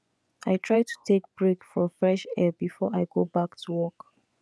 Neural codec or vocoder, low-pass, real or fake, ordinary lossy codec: vocoder, 24 kHz, 100 mel bands, Vocos; none; fake; none